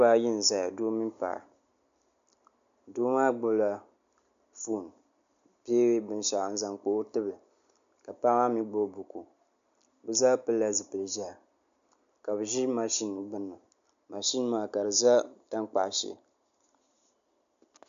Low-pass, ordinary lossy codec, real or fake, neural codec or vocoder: 7.2 kHz; AAC, 64 kbps; real; none